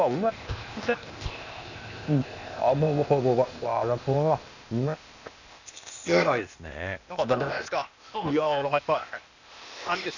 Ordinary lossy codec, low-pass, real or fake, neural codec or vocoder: none; 7.2 kHz; fake; codec, 16 kHz, 0.8 kbps, ZipCodec